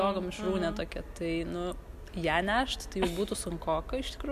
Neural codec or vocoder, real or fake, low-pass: none; real; 14.4 kHz